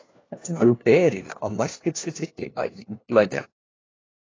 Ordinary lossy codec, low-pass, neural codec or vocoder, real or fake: AAC, 32 kbps; 7.2 kHz; codec, 16 kHz, 1 kbps, FunCodec, trained on LibriTTS, 50 frames a second; fake